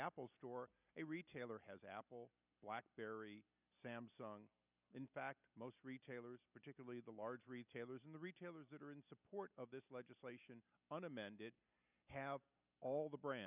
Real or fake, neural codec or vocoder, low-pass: real; none; 3.6 kHz